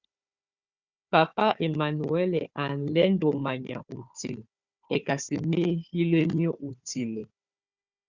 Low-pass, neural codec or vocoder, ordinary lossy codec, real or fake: 7.2 kHz; codec, 16 kHz, 4 kbps, FunCodec, trained on Chinese and English, 50 frames a second; Opus, 64 kbps; fake